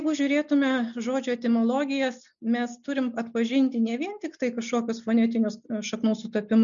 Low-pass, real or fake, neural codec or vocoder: 7.2 kHz; real; none